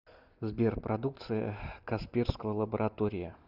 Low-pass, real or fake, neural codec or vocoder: 5.4 kHz; real; none